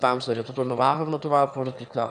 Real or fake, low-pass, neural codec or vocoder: fake; 9.9 kHz; autoencoder, 22.05 kHz, a latent of 192 numbers a frame, VITS, trained on one speaker